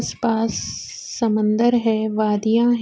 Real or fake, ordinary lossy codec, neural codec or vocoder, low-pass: real; none; none; none